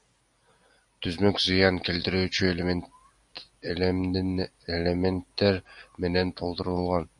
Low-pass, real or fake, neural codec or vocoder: 10.8 kHz; real; none